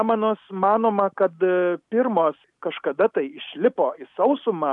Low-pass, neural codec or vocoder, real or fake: 10.8 kHz; none; real